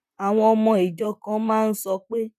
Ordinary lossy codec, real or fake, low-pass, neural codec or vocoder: none; fake; 14.4 kHz; vocoder, 44.1 kHz, 128 mel bands, Pupu-Vocoder